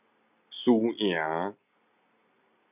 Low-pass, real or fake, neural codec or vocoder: 3.6 kHz; real; none